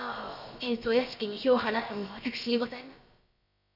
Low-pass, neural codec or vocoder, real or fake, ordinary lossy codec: 5.4 kHz; codec, 16 kHz, about 1 kbps, DyCAST, with the encoder's durations; fake; none